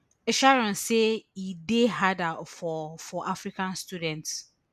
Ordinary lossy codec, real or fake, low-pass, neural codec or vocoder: none; real; 14.4 kHz; none